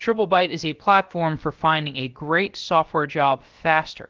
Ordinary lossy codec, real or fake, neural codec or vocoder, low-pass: Opus, 16 kbps; fake; codec, 16 kHz, about 1 kbps, DyCAST, with the encoder's durations; 7.2 kHz